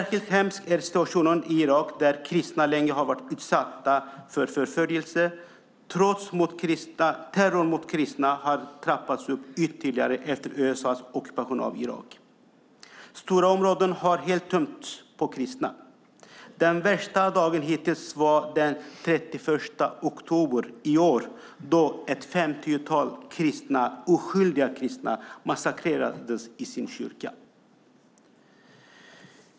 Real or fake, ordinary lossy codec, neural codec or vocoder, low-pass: real; none; none; none